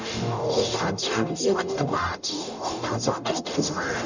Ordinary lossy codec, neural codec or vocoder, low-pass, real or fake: none; codec, 44.1 kHz, 0.9 kbps, DAC; 7.2 kHz; fake